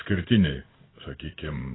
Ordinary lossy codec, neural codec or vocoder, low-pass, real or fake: AAC, 16 kbps; codec, 16 kHz in and 24 kHz out, 1 kbps, XY-Tokenizer; 7.2 kHz; fake